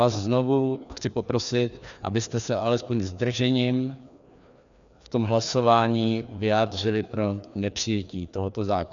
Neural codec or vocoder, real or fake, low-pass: codec, 16 kHz, 2 kbps, FreqCodec, larger model; fake; 7.2 kHz